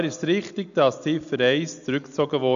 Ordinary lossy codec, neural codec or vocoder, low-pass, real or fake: none; none; 7.2 kHz; real